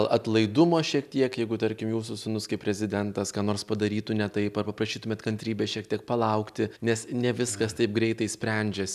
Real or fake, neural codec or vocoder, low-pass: real; none; 14.4 kHz